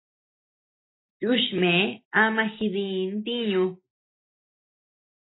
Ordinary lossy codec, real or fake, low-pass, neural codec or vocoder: AAC, 16 kbps; real; 7.2 kHz; none